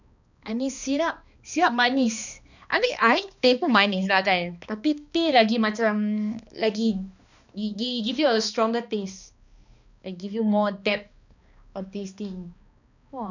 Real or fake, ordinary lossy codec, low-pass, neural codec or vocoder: fake; none; 7.2 kHz; codec, 16 kHz, 2 kbps, X-Codec, HuBERT features, trained on balanced general audio